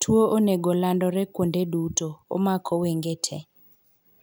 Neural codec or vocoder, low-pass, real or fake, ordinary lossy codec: none; none; real; none